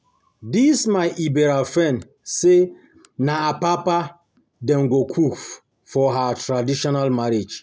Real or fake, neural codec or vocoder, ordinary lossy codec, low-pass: real; none; none; none